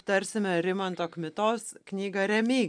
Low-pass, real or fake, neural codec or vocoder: 9.9 kHz; real; none